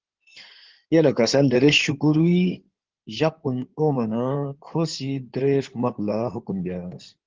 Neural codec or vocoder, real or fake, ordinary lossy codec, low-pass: codec, 16 kHz in and 24 kHz out, 2.2 kbps, FireRedTTS-2 codec; fake; Opus, 16 kbps; 7.2 kHz